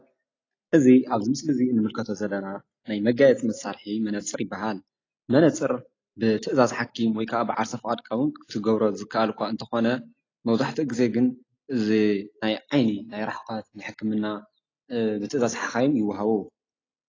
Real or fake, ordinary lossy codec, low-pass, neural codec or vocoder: real; AAC, 32 kbps; 7.2 kHz; none